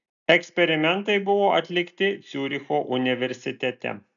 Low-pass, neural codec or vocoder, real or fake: 7.2 kHz; none; real